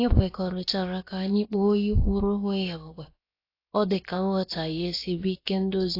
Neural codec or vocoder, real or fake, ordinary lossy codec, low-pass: codec, 16 kHz, about 1 kbps, DyCAST, with the encoder's durations; fake; AAC, 32 kbps; 5.4 kHz